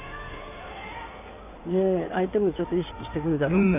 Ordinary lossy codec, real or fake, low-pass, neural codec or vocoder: none; fake; 3.6 kHz; codec, 16 kHz in and 24 kHz out, 2.2 kbps, FireRedTTS-2 codec